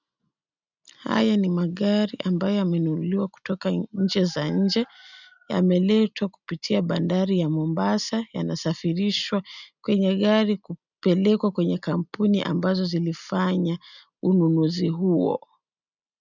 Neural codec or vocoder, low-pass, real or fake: none; 7.2 kHz; real